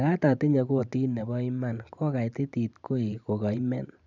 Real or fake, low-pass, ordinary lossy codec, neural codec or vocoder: fake; 7.2 kHz; none; vocoder, 44.1 kHz, 128 mel bands every 512 samples, BigVGAN v2